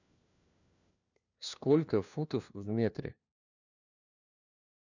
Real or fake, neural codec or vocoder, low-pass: fake; codec, 16 kHz, 1 kbps, FunCodec, trained on LibriTTS, 50 frames a second; 7.2 kHz